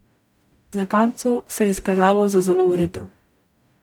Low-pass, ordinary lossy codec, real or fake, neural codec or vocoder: 19.8 kHz; none; fake; codec, 44.1 kHz, 0.9 kbps, DAC